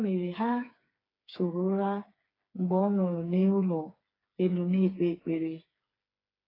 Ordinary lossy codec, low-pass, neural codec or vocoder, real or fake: AAC, 24 kbps; 5.4 kHz; codec, 16 kHz, 4 kbps, FreqCodec, smaller model; fake